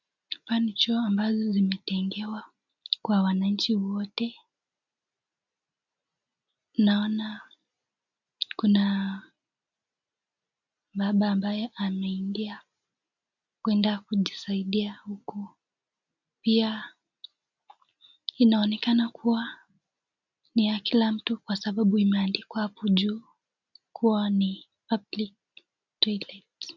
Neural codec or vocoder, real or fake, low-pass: none; real; 7.2 kHz